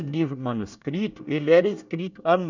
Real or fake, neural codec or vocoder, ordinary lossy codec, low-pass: fake; codec, 24 kHz, 1 kbps, SNAC; none; 7.2 kHz